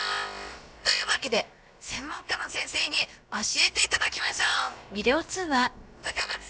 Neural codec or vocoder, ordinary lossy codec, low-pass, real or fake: codec, 16 kHz, about 1 kbps, DyCAST, with the encoder's durations; none; none; fake